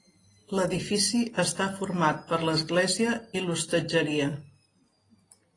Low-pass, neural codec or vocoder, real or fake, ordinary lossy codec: 10.8 kHz; none; real; AAC, 32 kbps